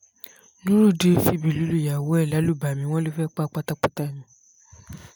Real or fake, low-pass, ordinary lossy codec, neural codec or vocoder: real; none; none; none